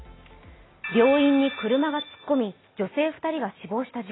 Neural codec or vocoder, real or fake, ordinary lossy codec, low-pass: none; real; AAC, 16 kbps; 7.2 kHz